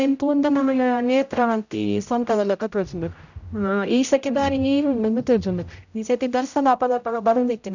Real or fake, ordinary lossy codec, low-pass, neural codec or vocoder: fake; none; 7.2 kHz; codec, 16 kHz, 0.5 kbps, X-Codec, HuBERT features, trained on general audio